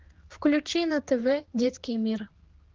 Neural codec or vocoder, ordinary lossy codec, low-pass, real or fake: codec, 16 kHz, 4 kbps, X-Codec, HuBERT features, trained on general audio; Opus, 16 kbps; 7.2 kHz; fake